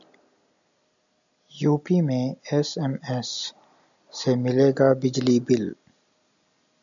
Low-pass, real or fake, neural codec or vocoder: 7.2 kHz; real; none